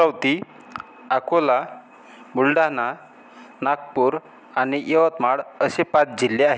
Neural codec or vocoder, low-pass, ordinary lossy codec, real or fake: none; none; none; real